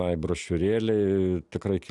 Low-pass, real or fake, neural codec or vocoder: 10.8 kHz; real; none